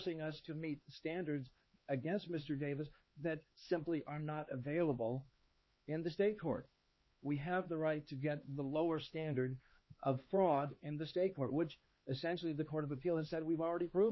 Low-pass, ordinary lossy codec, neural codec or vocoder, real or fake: 7.2 kHz; MP3, 24 kbps; codec, 16 kHz, 4 kbps, X-Codec, HuBERT features, trained on LibriSpeech; fake